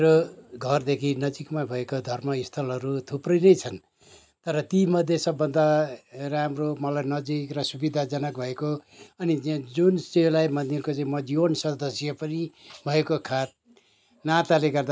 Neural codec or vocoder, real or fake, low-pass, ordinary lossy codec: none; real; none; none